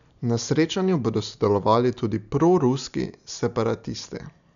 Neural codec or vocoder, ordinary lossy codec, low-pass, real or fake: none; none; 7.2 kHz; real